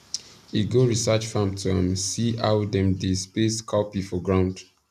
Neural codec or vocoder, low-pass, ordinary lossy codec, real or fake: none; 14.4 kHz; none; real